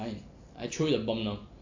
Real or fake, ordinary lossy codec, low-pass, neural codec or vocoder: real; AAC, 48 kbps; 7.2 kHz; none